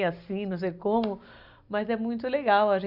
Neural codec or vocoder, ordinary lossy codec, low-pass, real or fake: none; none; 5.4 kHz; real